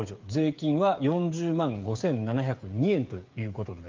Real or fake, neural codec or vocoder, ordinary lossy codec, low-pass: fake; codec, 16 kHz, 8 kbps, FreqCodec, smaller model; Opus, 24 kbps; 7.2 kHz